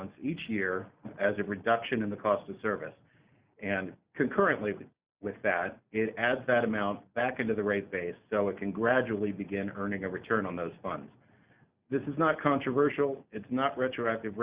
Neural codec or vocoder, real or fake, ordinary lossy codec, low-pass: none; real; Opus, 64 kbps; 3.6 kHz